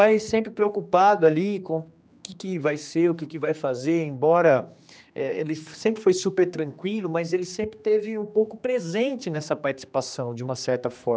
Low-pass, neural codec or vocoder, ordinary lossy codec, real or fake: none; codec, 16 kHz, 2 kbps, X-Codec, HuBERT features, trained on general audio; none; fake